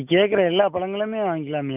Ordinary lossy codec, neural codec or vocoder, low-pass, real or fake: none; none; 3.6 kHz; real